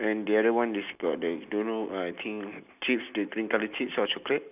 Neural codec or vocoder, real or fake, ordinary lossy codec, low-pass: none; real; none; 3.6 kHz